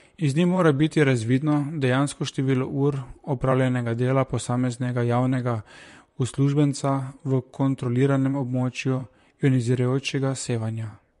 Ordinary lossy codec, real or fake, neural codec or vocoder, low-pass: MP3, 48 kbps; fake; vocoder, 44.1 kHz, 128 mel bands, Pupu-Vocoder; 14.4 kHz